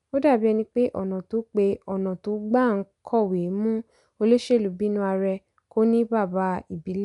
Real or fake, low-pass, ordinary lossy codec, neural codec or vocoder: real; 10.8 kHz; none; none